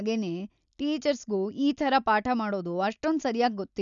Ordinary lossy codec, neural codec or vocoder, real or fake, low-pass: none; none; real; 7.2 kHz